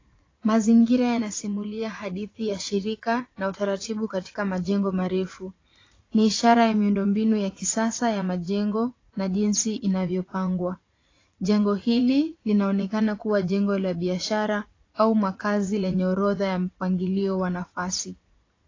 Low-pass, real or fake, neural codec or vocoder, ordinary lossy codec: 7.2 kHz; fake; vocoder, 44.1 kHz, 80 mel bands, Vocos; AAC, 32 kbps